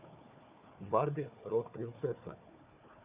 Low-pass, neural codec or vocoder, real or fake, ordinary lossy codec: 3.6 kHz; codec, 16 kHz, 4 kbps, FunCodec, trained on Chinese and English, 50 frames a second; fake; Opus, 64 kbps